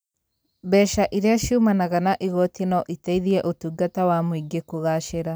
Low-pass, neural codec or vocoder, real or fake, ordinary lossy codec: none; none; real; none